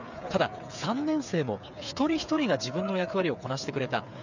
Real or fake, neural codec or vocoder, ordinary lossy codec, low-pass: fake; codec, 16 kHz, 8 kbps, FreqCodec, smaller model; none; 7.2 kHz